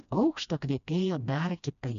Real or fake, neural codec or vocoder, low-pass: fake; codec, 16 kHz, 1 kbps, FreqCodec, smaller model; 7.2 kHz